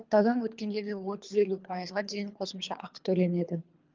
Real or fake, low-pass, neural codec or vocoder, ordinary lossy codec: fake; 7.2 kHz; codec, 24 kHz, 3 kbps, HILCodec; Opus, 24 kbps